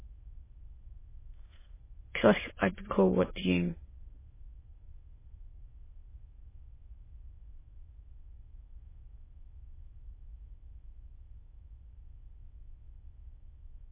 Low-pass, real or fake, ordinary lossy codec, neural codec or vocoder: 3.6 kHz; fake; MP3, 16 kbps; autoencoder, 22.05 kHz, a latent of 192 numbers a frame, VITS, trained on many speakers